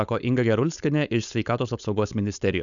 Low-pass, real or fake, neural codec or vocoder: 7.2 kHz; fake; codec, 16 kHz, 4.8 kbps, FACodec